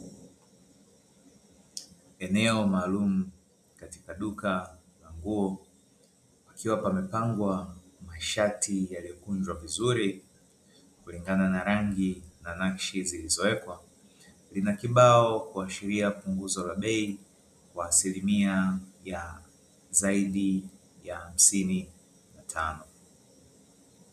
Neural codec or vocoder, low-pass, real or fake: none; 14.4 kHz; real